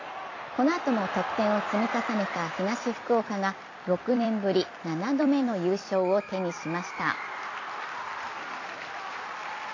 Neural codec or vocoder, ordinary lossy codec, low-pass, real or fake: vocoder, 44.1 kHz, 128 mel bands every 256 samples, BigVGAN v2; AAC, 32 kbps; 7.2 kHz; fake